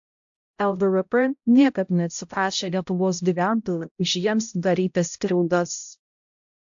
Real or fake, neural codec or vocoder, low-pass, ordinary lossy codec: fake; codec, 16 kHz, 0.5 kbps, X-Codec, HuBERT features, trained on balanced general audio; 7.2 kHz; AAC, 64 kbps